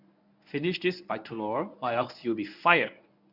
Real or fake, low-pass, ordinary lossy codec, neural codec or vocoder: fake; 5.4 kHz; none; codec, 24 kHz, 0.9 kbps, WavTokenizer, medium speech release version 1